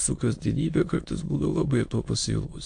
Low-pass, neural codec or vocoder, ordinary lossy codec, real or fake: 9.9 kHz; autoencoder, 22.05 kHz, a latent of 192 numbers a frame, VITS, trained on many speakers; AAC, 48 kbps; fake